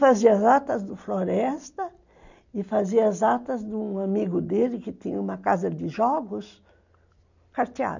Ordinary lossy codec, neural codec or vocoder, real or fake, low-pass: none; none; real; 7.2 kHz